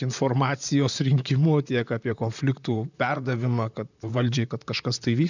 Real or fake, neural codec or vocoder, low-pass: fake; vocoder, 44.1 kHz, 128 mel bands, Pupu-Vocoder; 7.2 kHz